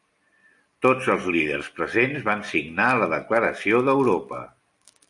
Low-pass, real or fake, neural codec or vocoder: 10.8 kHz; real; none